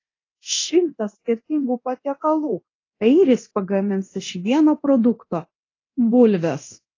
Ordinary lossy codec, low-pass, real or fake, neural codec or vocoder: AAC, 32 kbps; 7.2 kHz; fake; codec, 24 kHz, 0.9 kbps, DualCodec